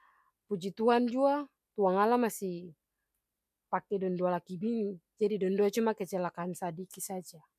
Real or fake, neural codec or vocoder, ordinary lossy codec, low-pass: real; none; none; 14.4 kHz